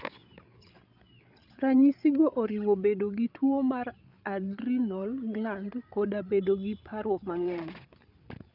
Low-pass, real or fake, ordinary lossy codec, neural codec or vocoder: 5.4 kHz; fake; none; codec, 16 kHz, 8 kbps, FreqCodec, larger model